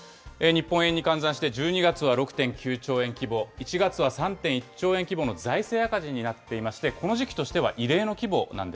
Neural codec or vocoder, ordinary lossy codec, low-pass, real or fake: none; none; none; real